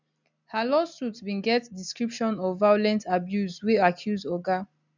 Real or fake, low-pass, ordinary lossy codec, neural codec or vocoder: real; 7.2 kHz; none; none